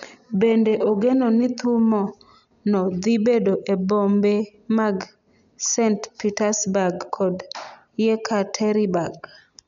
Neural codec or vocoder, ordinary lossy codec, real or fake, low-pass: none; none; real; 7.2 kHz